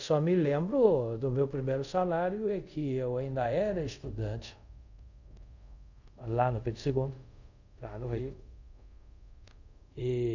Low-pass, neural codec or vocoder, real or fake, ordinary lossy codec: 7.2 kHz; codec, 24 kHz, 0.5 kbps, DualCodec; fake; none